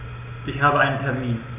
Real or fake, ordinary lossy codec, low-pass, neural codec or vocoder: real; none; 3.6 kHz; none